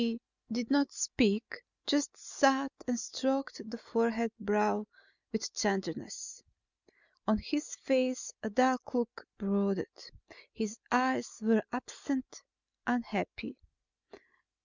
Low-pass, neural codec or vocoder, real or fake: 7.2 kHz; none; real